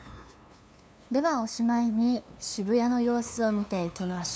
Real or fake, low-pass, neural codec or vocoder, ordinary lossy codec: fake; none; codec, 16 kHz, 2 kbps, FunCodec, trained on LibriTTS, 25 frames a second; none